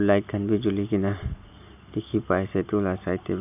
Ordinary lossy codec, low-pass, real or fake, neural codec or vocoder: AAC, 32 kbps; 3.6 kHz; real; none